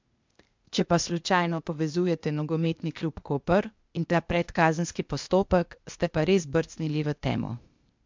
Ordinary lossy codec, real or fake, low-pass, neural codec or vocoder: MP3, 64 kbps; fake; 7.2 kHz; codec, 16 kHz, 0.8 kbps, ZipCodec